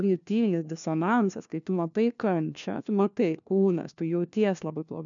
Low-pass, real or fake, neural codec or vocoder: 7.2 kHz; fake; codec, 16 kHz, 1 kbps, FunCodec, trained on LibriTTS, 50 frames a second